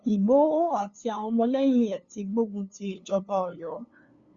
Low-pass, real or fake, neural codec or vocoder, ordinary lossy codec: 7.2 kHz; fake; codec, 16 kHz, 2 kbps, FunCodec, trained on LibriTTS, 25 frames a second; Opus, 64 kbps